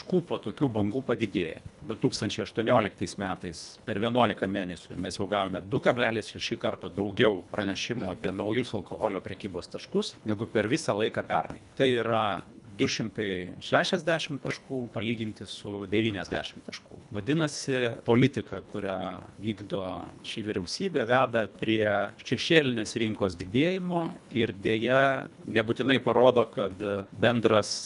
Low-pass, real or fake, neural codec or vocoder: 10.8 kHz; fake; codec, 24 kHz, 1.5 kbps, HILCodec